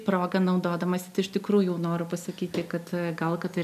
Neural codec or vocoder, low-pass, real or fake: none; 14.4 kHz; real